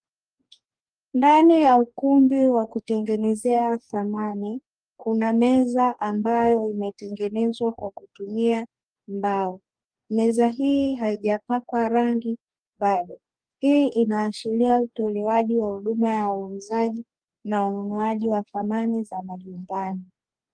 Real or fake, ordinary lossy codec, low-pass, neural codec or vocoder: fake; Opus, 32 kbps; 9.9 kHz; codec, 44.1 kHz, 2.6 kbps, DAC